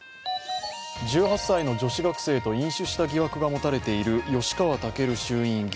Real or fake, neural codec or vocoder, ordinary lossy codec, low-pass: real; none; none; none